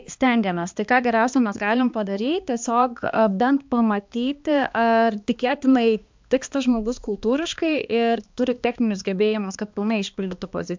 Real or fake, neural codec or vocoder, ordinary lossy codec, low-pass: fake; codec, 16 kHz, 2 kbps, X-Codec, HuBERT features, trained on balanced general audio; MP3, 64 kbps; 7.2 kHz